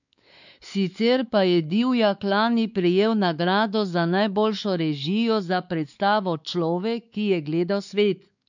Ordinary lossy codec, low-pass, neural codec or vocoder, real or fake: none; 7.2 kHz; codec, 16 kHz, 4 kbps, X-Codec, WavLM features, trained on Multilingual LibriSpeech; fake